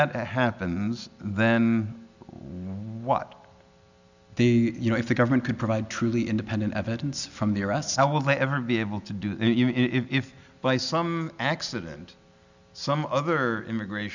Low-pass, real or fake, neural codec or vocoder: 7.2 kHz; real; none